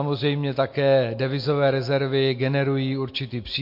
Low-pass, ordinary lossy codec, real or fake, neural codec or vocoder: 5.4 kHz; MP3, 32 kbps; real; none